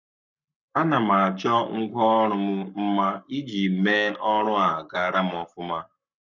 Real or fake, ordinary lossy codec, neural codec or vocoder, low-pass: fake; none; codec, 44.1 kHz, 7.8 kbps, Pupu-Codec; 7.2 kHz